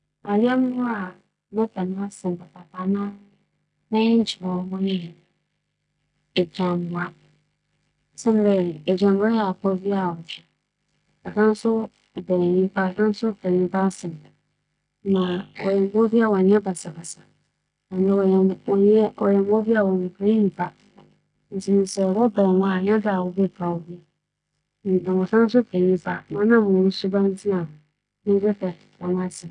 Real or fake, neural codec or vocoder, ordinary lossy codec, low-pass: real; none; none; 9.9 kHz